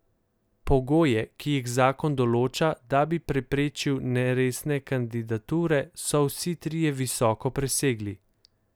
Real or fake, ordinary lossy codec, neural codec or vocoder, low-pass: real; none; none; none